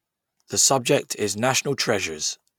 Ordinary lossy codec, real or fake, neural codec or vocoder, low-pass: none; real; none; 19.8 kHz